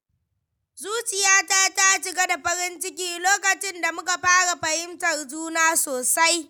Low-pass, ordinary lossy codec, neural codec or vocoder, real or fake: none; none; none; real